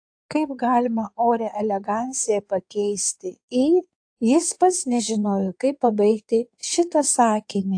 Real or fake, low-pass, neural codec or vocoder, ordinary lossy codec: fake; 9.9 kHz; codec, 16 kHz in and 24 kHz out, 2.2 kbps, FireRedTTS-2 codec; AAC, 64 kbps